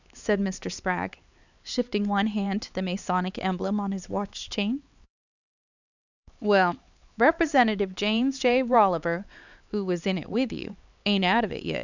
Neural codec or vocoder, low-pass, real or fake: codec, 16 kHz, 4 kbps, X-Codec, HuBERT features, trained on LibriSpeech; 7.2 kHz; fake